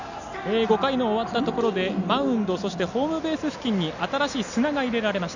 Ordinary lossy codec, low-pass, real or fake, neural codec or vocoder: none; 7.2 kHz; real; none